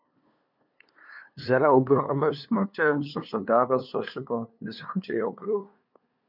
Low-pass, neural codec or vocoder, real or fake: 5.4 kHz; codec, 16 kHz, 2 kbps, FunCodec, trained on LibriTTS, 25 frames a second; fake